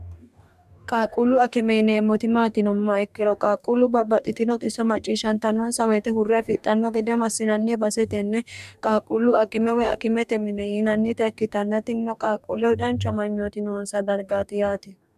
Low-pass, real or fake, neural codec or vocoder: 14.4 kHz; fake; codec, 44.1 kHz, 2.6 kbps, DAC